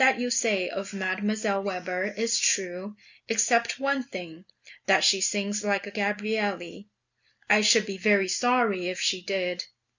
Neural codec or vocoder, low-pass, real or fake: none; 7.2 kHz; real